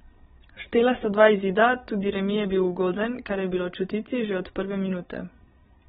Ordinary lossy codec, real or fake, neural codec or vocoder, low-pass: AAC, 16 kbps; fake; codec, 16 kHz, 16 kbps, FreqCodec, larger model; 7.2 kHz